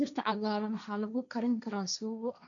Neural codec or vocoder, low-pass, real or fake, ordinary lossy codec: codec, 16 kHz, 1.1 kbps, Voila-Tokenizer; 7.2 kHz; fake; none